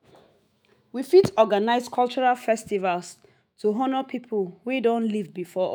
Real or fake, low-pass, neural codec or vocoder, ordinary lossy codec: fake; none; autoencoder, 48 kHz, 128 numbers a frame, DAC-VAE, trained on Japanese speech; none